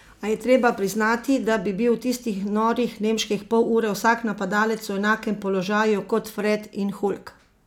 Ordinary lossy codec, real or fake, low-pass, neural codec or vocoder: none; real; 19.8 kHz; none